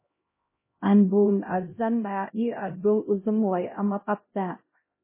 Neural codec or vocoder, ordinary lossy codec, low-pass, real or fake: codec, 16 kHz, 0.5 kbps, X-Codec, HuBERT features, trained on LibriSpeech; MP3, 16 kbps; 3.6 kHz; fake